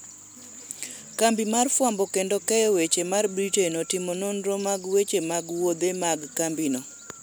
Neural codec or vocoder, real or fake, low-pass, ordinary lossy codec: none; real; none; none